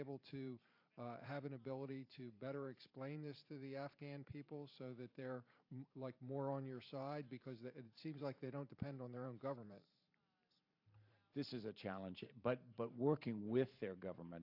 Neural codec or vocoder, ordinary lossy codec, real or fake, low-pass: vocoder, 44.1 kHz, 128 mel bands every 512 samples, BigVGAN v2; AAC, 48 kbps; fake; 5.4 kHz